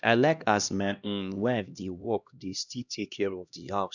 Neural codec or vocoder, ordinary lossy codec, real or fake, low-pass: codec, 16 kHz, 1 kbps, X-Codec, HuBERT features, trained on LibriSpeech; none; fake; 7.2 kHz